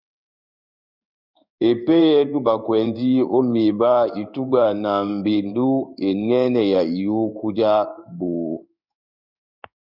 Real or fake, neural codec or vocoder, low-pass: fake; codec, 16 kHz in and 24 kHz out, 1 kbps, XY-Tokenizer; 5.4 kHz